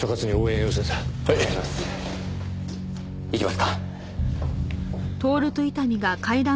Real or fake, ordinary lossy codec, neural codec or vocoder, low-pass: real; none; none; none